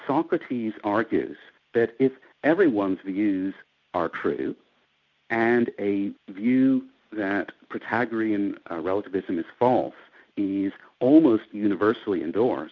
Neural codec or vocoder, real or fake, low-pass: none; real; 7.2 kHz